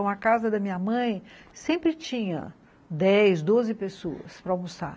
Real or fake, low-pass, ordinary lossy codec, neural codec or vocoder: real; none; none; none